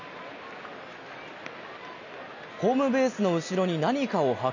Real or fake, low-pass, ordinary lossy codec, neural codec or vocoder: real; 7.2 kHz; none; none